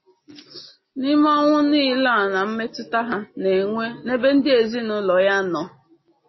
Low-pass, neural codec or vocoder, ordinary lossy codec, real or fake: 7.2 kHz; none; MP3, 24 kbps; real